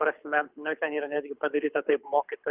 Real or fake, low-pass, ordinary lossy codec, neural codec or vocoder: fake; 3.6 kHz; Opus, 24 kbps; codec, 24 kHz, 6 kbps, HILCodec